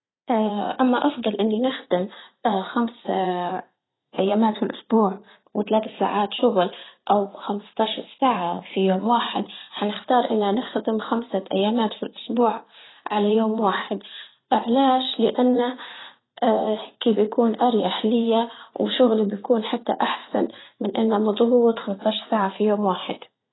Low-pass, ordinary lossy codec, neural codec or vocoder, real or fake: 7.2 kHz; AAC, 16 kbps; vocoder, 44.1 kHz, 80 mel bands, Vocos; fake